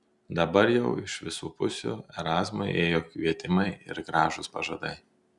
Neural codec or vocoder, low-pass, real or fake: none; 10.8 kHz; real